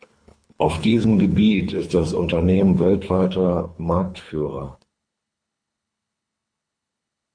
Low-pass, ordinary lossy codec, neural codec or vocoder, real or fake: 9.9 kHz; MP3, 64 kbps; codec, 24 kHz, 3 kbps, HILCodec; fake